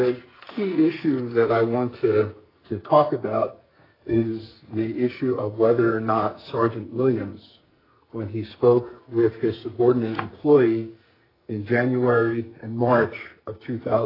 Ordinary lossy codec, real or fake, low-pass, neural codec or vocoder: AAC, 24 kbps; fake; 5.4 kHz; codec, 44.1 kHz, 2.6 kbps, SNAC